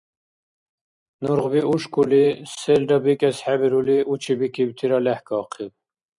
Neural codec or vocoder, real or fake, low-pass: vocoder, 44.1 kHz, 128 mel bands every 512 samples, BigVGAN v2; fake; 10.8 kHz